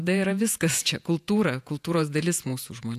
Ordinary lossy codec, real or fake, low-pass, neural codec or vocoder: AAC, 96 kbps; fake; 14.4 kHz; vocoder, 48 kHz, 128 mel bands, Vocos